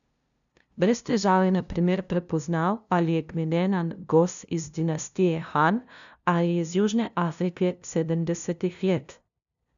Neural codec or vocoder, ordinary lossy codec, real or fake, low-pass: codec, 16 kHz, 0.5 kbps, FunCodec, trained on LibriTTS, 25 frames a second; none; fake; 7.2 kHz